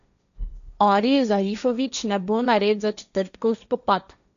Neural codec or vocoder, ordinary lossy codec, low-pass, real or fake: codec, 16 kHz, 1.1 kbps, Voila-Tokenizer; none; 7.2 kHz; fake